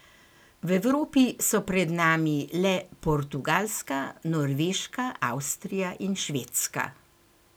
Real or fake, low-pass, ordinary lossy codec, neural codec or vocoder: real; none; none; none